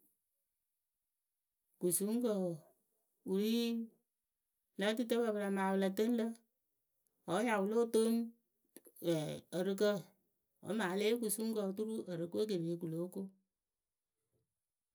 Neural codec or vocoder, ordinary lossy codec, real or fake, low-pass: none; none; real; none